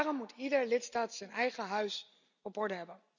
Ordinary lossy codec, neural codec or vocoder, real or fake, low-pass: none; none; real; 7.2 kHz